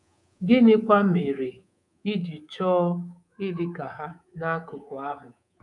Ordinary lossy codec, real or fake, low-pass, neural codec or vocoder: none; fake; 10.8 kHz; codec, 24 kHz, 3.1 kbps, DualCodec